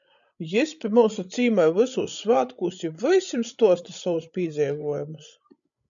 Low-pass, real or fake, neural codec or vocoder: 7.2 kHz; fake; codec, 16 kHz, 16 kbps, FreqCodec, larger model